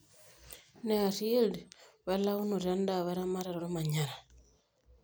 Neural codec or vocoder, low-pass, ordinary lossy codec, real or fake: none; none; none; real